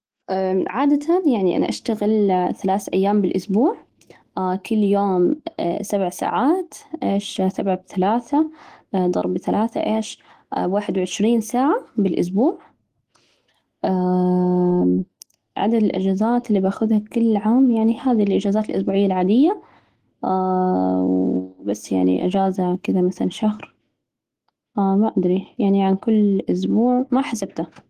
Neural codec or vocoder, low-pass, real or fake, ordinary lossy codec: autoencoder, 48 kHz, 128 numbers a frame, DAC-VAE, trained on Japanese speech; 14.4 kHz; fake; Opus, 16 kbps